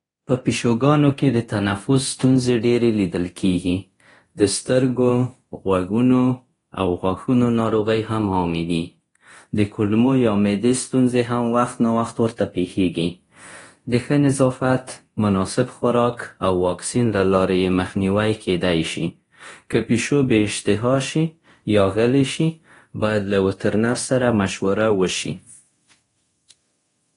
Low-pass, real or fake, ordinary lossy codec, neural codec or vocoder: 10.8 kHz; fake; AAC, 32 kbps; codec, 24 kHz, 0.9 kbps, DualCodec